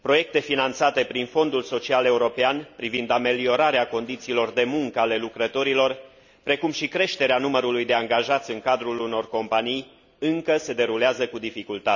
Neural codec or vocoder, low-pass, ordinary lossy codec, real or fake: none; 7.2 kHz; none; real